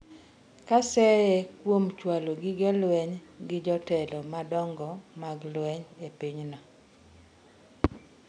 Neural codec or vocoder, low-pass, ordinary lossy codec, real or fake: none; 9.9 kHz; none; real